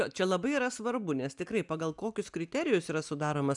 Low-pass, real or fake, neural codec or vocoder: 10.8 kHz; real; none